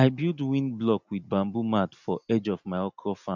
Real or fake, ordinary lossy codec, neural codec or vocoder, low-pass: real; MP3, 64 kbps; none; 7.2 kHz